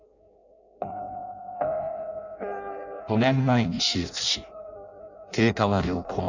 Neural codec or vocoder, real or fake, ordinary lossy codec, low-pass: codec, 16 kHz in and 24 kHz out, 0.6 kbps, FireRedTTS-2 codec; fake; none; 7.2 kHz